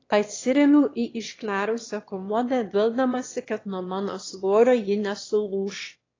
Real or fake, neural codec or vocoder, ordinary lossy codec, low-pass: fake; autoencoder, 22.05 kHz, a latent of 192 numbers a frame, VITS, trained on one speaker; AAC, 32 kbps; 7.2 kHz